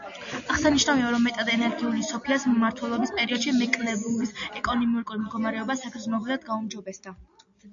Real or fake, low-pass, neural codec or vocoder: real; 7.2 kHz; none